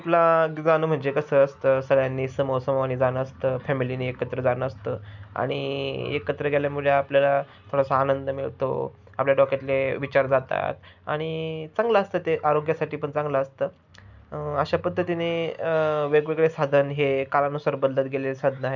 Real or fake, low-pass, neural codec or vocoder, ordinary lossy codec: real; 7.2 kHz; none; none